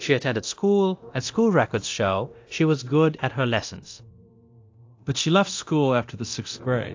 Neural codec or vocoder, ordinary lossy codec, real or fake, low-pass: codec, 24 kHz, 0.9 kbps, DualCodec; AAC, 48 kbps; fake; 7.2 kHz